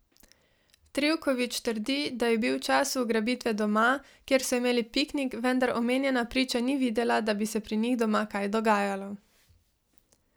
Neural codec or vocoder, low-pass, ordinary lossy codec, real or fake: none; none; none; real